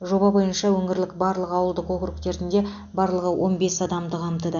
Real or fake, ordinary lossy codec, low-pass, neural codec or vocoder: real; none; 7.2 kHz; none